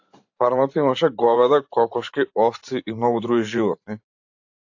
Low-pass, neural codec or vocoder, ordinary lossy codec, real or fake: 7.2 kHz; vocoder, 44.1 kHz, 128 mel bands every 512 samples, BigVGAN v2; AAC, 48 kbps; fake